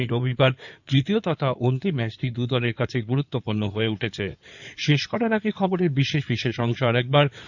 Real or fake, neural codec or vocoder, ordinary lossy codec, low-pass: fake; codec, 16 kHz in and 24 kHz out, 2.2 kbps, FireRedTTS-2 codec; none; 7.2 kHz